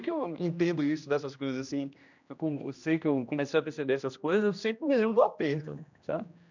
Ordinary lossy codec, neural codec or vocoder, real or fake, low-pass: none; codec, 16 kHz, 1 kbps, X-Codec, HuBERT features, trained on general audio; fake; 7.2 kHz